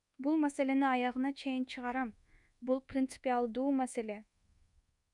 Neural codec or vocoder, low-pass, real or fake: codec, 24 kHz, 1.2 kbps, DualCodec; 10.8 kHz; fake